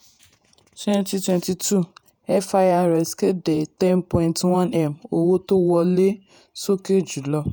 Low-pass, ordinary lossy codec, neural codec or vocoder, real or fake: none; none; vocoder, 48 kHz, 128 mel bands, Vocos; fake